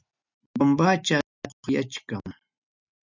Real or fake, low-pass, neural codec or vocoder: real; 7.2 kHz; none